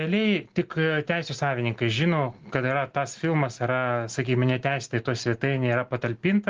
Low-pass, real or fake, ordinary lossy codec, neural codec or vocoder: 7.2 kHz; real; Opus, 16 kbps; none